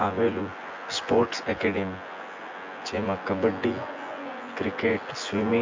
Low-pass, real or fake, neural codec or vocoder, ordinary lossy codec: 7.2 kHz; fake; vocoder, 24 kHz, 100 mel bands, Vocos; MP3, 48 kbps